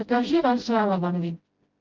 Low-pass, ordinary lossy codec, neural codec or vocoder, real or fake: 7.2 kHz; Opus, 32 kbps; codec, 16 kHz, 0.5 kbps, FreqCodec, smaller model; fake